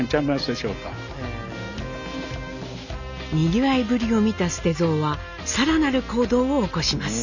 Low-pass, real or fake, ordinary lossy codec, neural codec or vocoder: 7.2 kHz; real; Opus, 64 kbps; none